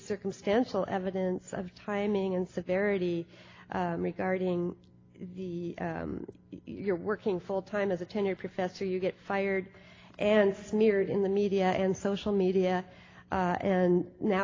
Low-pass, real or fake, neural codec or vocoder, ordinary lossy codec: 7.2 kHz; real; none; AAC, 32 kbps